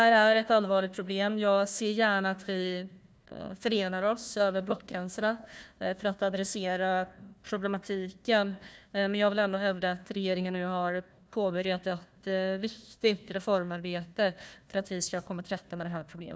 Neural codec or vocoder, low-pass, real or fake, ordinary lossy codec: codec, 16 kHz, 1 kbps, FunCodec, trained on Chinese and English, 50 frames a second; none; fake; none